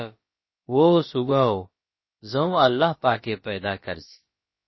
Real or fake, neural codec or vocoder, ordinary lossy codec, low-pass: fake; codec, 16 kHz, about 1 kbps, DyCAST, with the encoder's durations; MP3, 24 kbps; 7.2 kHz